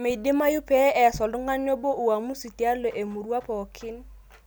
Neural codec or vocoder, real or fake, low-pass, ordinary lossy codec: none; real; none; none